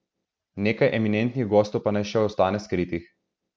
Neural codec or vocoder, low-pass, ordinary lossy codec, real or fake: none; none; none; real